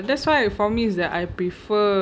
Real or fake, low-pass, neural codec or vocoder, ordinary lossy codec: real; none; none; none